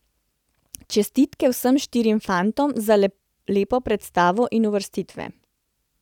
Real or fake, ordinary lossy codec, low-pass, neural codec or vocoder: real; none; 19.8 kHz; none